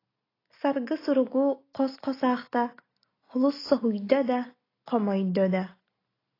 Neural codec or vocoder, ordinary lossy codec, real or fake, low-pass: none; AAC, 24 kbps; real; 5.4 kHz